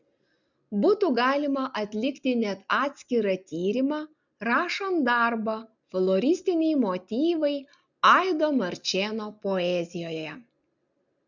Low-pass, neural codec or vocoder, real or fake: 7.2 kHz; none; real